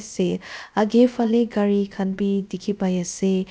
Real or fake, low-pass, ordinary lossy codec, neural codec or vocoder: fake; none; none; codec, 16 kHz, about 1 kbps, DyCAST, with the encoder's durations